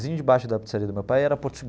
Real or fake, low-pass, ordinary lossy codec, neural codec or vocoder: real; none; none; none